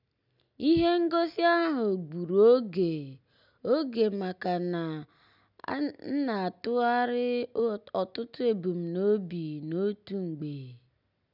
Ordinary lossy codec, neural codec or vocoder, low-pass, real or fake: none; none; 5.4 kHz; real